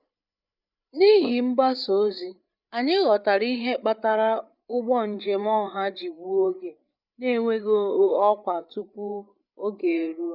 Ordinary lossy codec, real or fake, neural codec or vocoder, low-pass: none; fake; codec, 16 kHz, 8 kbps, FreqCodec, larger model; 5.4 kHz